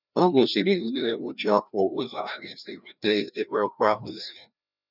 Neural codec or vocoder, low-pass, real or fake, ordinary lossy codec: codec, 16 kHz, 1 kbps, FreqCodec, larger model; 5.4 kHz; fake; none